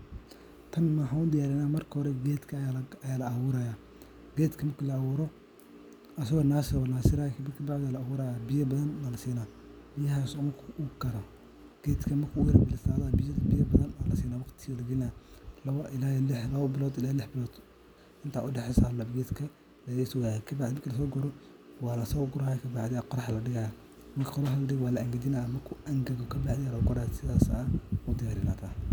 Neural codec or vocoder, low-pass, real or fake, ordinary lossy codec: none; none; real; none